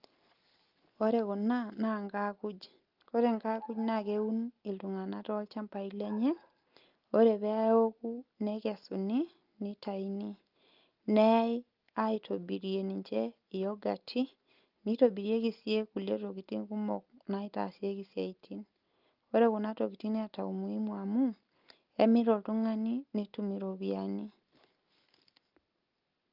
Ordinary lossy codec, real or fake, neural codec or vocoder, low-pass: Opus, 24 kbps; real; none; 5.4 kHz